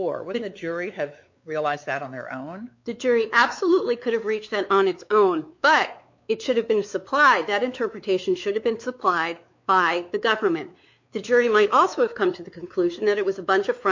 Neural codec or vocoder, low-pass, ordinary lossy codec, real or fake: codec, 16 kHz, 4 kbps, X-Codec, WavLM features, trained on Multilingual LibriSpeech; 7.2 kHz; MP3, 48 kbps; fake